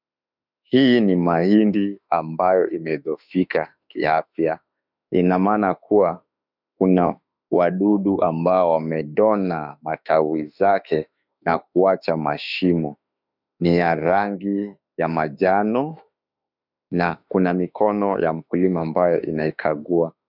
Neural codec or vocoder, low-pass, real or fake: autoencoder, 48 kHz, 32 numbers a frame, DAC-VAE, trained on Japanese speech; 5.4 kHz; fake